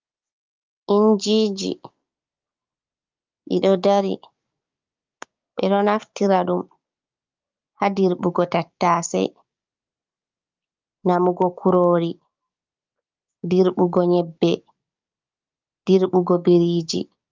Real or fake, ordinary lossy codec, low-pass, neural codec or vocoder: fake; Opus, 24 kbps; 7.2 kHz; codec, 16 kHz, 6 kbps, DAC